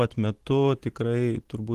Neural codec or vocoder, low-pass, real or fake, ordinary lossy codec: none; 14.4 kHz; real; Opus, 16 kbps